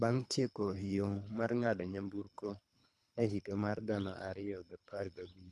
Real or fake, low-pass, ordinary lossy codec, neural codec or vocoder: fake; none; none; codec, 24 kHz, 3 kbps, HILCodec